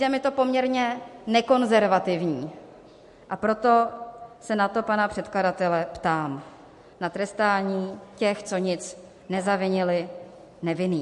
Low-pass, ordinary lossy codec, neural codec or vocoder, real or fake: 14.4 kHz; MP3, 48 kbps; none; real